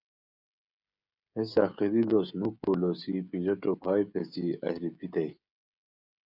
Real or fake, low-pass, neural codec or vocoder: fake; 5.4 kHz; codec, 16 kHz, 16 kbps, FreqCodec, smaller model